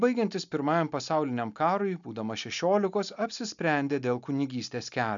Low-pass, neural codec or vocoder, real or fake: 7.2 kHz; none; real